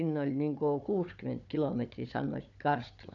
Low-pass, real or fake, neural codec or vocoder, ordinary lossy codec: 7.2 kHz; fake; codec, 16 kHz, 16 kbps, FunCodec, trained on Chinese and English, 50 frames a second; none